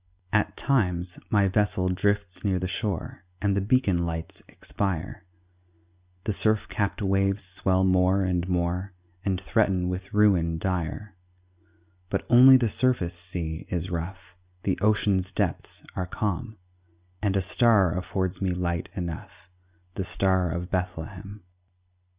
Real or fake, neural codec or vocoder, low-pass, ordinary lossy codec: real; none; 3.6 kHz; Opus, 64 kbps